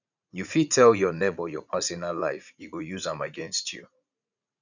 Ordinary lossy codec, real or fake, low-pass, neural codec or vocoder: none; fake; 7.2 kHz; vocoder, 44.1 kHz, 80 mel bands, Vocos